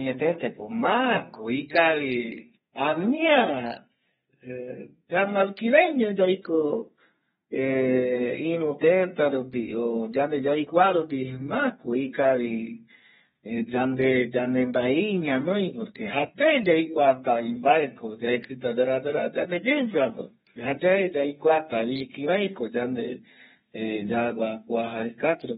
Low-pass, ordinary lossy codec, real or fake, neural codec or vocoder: 14.4 kHz; AAC, 16 kbps; fake; codec, 32 kHz, 1.9 kbps, SNAC